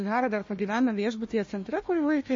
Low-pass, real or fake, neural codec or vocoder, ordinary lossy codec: 7.2 kHz; fake; codec, 16 kHz, 1 kbps, FunCodec, trained on Chinese and English, 50 frames a second; MP3, 32 kbps